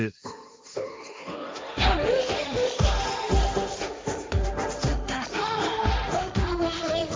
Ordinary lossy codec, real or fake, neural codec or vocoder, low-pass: none; fake; codec, 16 kHz, 1.1 kbps, Voila-Tokenizer; none